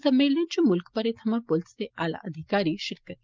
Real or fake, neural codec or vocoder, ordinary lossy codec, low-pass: real; none; Opus, 24 kbps; 7.2 kHz